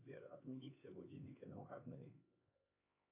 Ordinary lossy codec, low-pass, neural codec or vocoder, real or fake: AAC, 24 kbps; 3.6 kHz; codec, 16 kHz, 2 kbps, X-Codec, HuBERT features, trained on LibriSpeech; fake